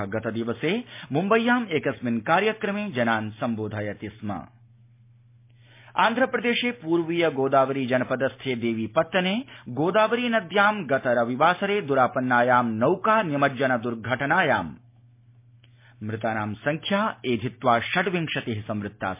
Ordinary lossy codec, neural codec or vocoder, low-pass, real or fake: MP3, 24 kbps; none; 3.6 kHz; real